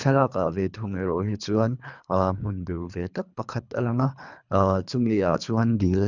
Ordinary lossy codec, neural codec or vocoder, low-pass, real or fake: none; codec, 24 kHz, 3 kbps, HILCodec; 7.2 kHz; fake